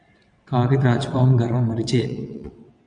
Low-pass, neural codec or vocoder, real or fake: 9.9 kHz; vocoder, 22.05 kHz, 80 mel bands, WaveNeXt; fake